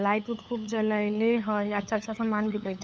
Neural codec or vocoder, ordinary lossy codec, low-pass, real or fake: codec, 16 kHz, 8 kbps, FunCodec, trained on LibriTTS, 25 frames a second; none; none; fake